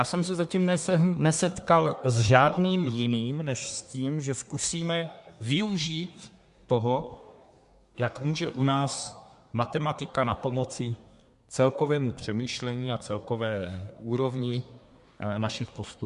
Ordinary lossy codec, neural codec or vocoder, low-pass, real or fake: MP3, 64 kbps; codec, 24 kHz, 1 kbps, SNAC; 10.8 kHz; fake